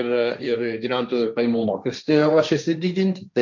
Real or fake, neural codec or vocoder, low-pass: fake; codec, 16 kHz, 1.1 kbps, Voila-Tokenizer; 7.2 kHz